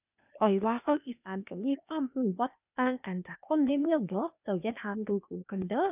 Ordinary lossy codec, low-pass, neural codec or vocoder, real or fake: none; 3.6 kHz; codec, 16 kHz, 0.8 kbps, ZipCodec; fake